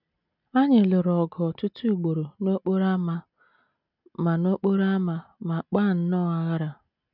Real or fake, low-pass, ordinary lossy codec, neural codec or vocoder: real; 5.4 kHz; none; none